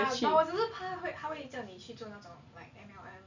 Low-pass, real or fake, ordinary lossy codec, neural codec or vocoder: 7.2 kHz; real; none; none